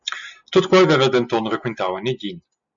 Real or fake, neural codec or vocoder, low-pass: real; none; 7.2 kHz